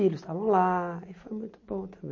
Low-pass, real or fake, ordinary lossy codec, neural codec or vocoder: 7.2 kHz; real; MP3, 32 kbps; none